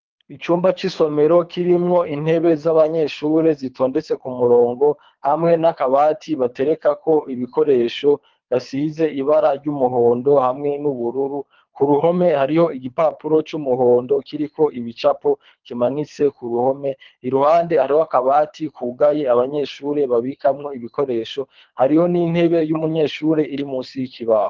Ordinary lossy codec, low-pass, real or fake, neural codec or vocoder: Opus, 24 kbps; 7.2 kHz; fake; codec, 24 kHz, 3 kbps, HILCodec